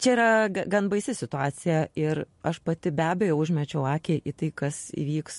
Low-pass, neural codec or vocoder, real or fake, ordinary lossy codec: 14.4 kHz; none; real; MP3, 48 kbps